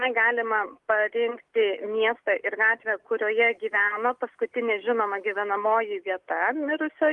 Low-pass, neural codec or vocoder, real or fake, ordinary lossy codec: 10.8 kHz; none; real; Opus, 24 kbps